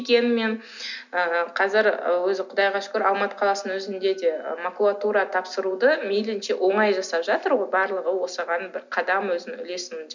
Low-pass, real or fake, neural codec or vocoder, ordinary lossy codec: 7.2 kHz; real; none; none